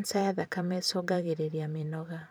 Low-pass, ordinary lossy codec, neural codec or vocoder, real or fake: none; none; vocoder, 44.1 kHz, 128 mel bands every 512 samples, BigVGAN v2; fake